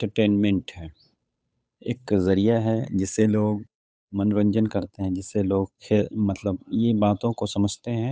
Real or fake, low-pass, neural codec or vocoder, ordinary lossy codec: fake; none; codec, 16 kHz, 8 kbps, FunCodec, trained on Chinese and English, 25 frames a second; none